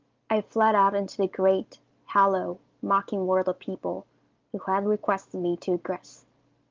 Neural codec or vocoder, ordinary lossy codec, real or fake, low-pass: none; Opus, 24 kbps; real; 7.2 kHz